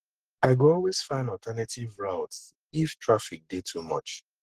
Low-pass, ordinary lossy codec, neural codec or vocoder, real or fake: 14.4 kHz; Opus, 16 kbps; codec, 44.1 kHz, 7.8 kbps, Pupu-Codec; fake